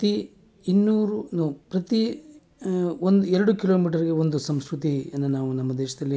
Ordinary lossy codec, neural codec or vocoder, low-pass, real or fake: none; none; none; real